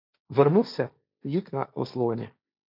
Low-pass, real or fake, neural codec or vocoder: 5.4 kHz; fake; codec, 16 kHz, 1.1 kbps, Voila-Tokenizer